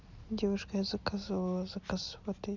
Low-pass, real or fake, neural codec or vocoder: 7.2 kHz; real; none